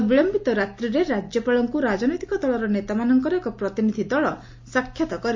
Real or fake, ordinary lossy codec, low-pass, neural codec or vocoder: real; MP3, 48 kbps; 7.2 kHz; none